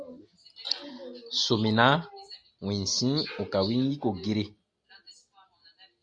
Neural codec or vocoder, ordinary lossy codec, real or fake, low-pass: none; Opus, 64 kbps; real; 9.9 kHz